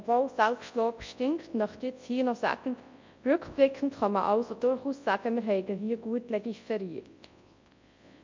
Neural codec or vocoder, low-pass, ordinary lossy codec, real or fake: codec, 24 kHz, 0.9 kbps, WavTokenizer, large speech release; 7.2 kHz; MP3, 48 kbps; fake